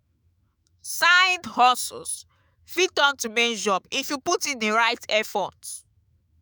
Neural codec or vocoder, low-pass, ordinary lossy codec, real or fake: autoencoder, 48 kHz, 128 numbers a frame, DAC-VAE, trained on Japanese speech; none; none; fake